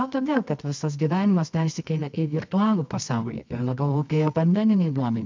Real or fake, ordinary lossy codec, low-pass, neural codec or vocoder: fake; MP3, 64 kbps; 7.2 kHz; codec, 24 kHz, 0.9 kbps, WavTokenizer, medium music audio release